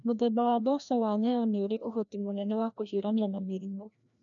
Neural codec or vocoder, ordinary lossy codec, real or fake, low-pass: codec, 16 kHz, 1 kbps, FreqCodec, larger model; none; fake; 7.2 kHz